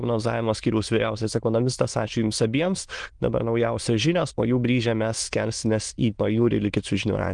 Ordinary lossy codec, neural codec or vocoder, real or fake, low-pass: Opus, 24 kbps; autoencoder, 22.05 kHz, a latent of 192 numbers a frame, VITS, trained on many speakers; fake; 9.9 kHz